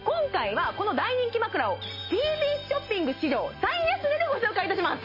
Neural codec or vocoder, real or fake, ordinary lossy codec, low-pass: none; real; MP3, 24 kbps; 5.4 kHz